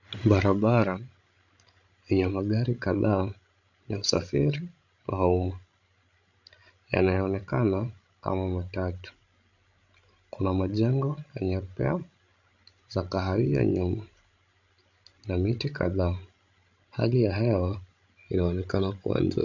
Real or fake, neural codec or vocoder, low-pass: fake; codec, 16 kHz, 16 kbps, FreqCodec, larger model; 7.2 kHz